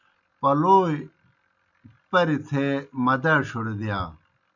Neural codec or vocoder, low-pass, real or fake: none; 7.2 kHz; real